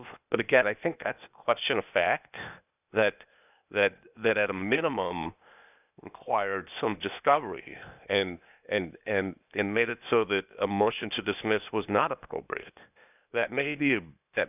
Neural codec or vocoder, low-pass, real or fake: codec, 16 kHz, 0.8 kbps, ZipCodec; 3.6 kHz; fake